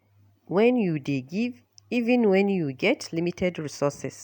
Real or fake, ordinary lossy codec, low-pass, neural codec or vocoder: real; none; 19.8 kHz; none